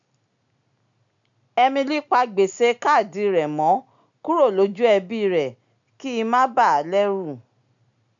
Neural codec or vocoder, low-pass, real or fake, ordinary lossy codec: none; 7.2 kHz; real; none